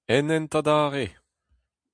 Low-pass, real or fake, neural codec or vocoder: 9.9 kHz; real; none